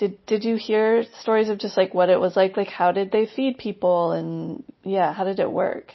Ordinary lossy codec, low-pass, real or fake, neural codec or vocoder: MP3, 24 kbps; 7.2 kHz; real; none